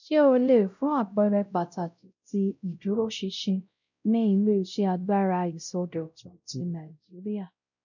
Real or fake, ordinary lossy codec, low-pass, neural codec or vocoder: fake; none; 7.2 kHz; codec, 16 kHz, 0.5 kbps, X-Codec, WavLM features, trained on Multilingual LibriSpeech